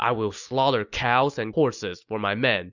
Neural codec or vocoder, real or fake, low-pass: none; real; 7.2 kHz